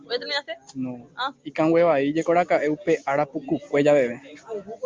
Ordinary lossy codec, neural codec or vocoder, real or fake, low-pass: Opus, 32 kbps; none; real; 7.2 kHz